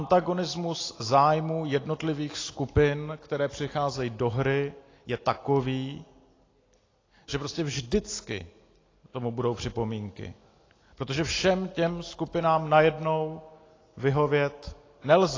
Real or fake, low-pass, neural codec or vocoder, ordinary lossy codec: real; 7.2 kHz; none; AAC, 32 kbps